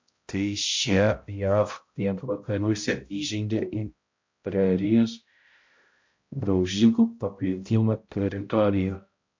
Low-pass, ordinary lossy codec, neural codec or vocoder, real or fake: 7.2 kHz; MP3, 48 kbps; codec, 16 kHz, 0.5 kbps, X-Codec, HuBERT features, trained on balanced general audio; fake